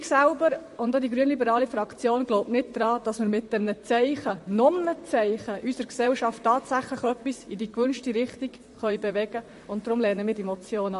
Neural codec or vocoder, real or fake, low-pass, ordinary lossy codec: vocoder, 44.1 kHz, 128 mel bands, Pupu-Vocoder; fake; 14.4 kHz; MP3, 48 kbps